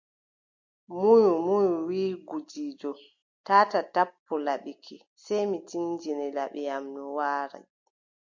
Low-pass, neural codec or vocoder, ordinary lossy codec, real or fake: 7.2 kHz; none; MP3, 48 kbps; real